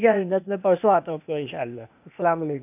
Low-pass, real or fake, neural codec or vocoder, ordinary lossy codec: 3.6 kHz; fake; codec, 16 kHz, 0.8 kbps, ZipCodec; none